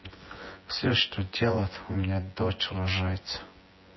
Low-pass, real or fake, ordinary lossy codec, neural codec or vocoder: 7.2 kHz; fake; MP3, 24 kbps; vocoder, 24 kHz, 100 mel bands, Vocos